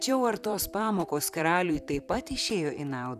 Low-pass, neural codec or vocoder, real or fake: 14.4 kHz; vocoder, 44.1 kHz, 128 mel bands, Pupu-Vocoder; fake